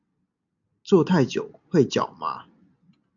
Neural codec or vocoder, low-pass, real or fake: none; 7.2 kHz; real